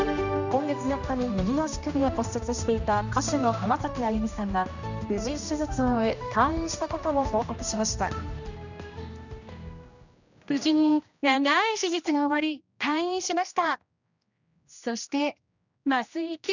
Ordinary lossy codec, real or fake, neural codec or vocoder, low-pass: none; fake; codec, 16 kHz, 1 kbps, X-Codec, HuBERT features, trained on general audio; 7.2 kHz